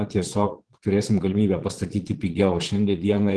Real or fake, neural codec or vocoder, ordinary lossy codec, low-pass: fake; vocoder, 22.05 kHz, 80 mel bands, Vocos; Opus, 16 kbps; 9.9 kHz